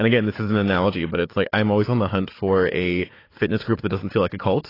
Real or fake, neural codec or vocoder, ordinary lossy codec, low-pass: fake; codec, 16 kHz, 4 kbps, FunCodec, trained on Chinese and English, 50 frames a second; AAC, 24 kbps; 5.4 kHz